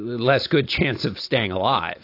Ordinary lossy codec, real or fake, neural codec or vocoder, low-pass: AAC, 32 kbps; real; none; 5.4 kHz